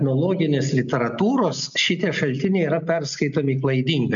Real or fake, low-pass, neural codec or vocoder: real; 7.2 kHz; none